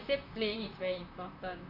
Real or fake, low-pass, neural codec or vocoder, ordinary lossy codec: fake; 5.4 kHz; vocoder, 44.1 kHz, 128 mel bands, Pupu-Vocoder; none